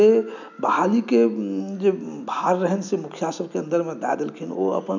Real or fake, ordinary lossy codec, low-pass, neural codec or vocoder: real; none; 7.2 kHz; none